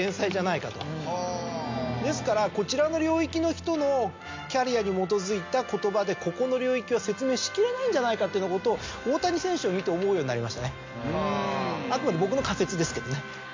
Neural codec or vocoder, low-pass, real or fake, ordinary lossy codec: none; 7.2 kHz; real; MP3, 64 kbps